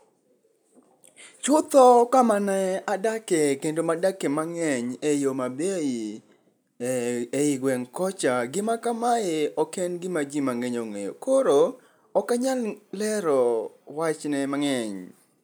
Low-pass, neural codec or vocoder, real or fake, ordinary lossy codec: none; none; real; none